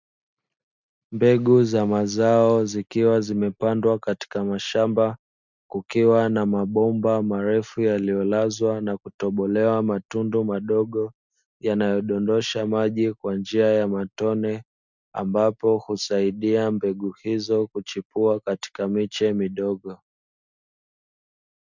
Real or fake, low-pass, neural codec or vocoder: real; 7.2 kHz; none